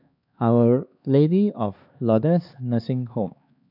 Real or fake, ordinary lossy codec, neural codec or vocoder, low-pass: fake; none; codec, 16 kHz, 2 kbps, X-Codec, HuBERT features, trained on LibriSpeech; 5.4 kHz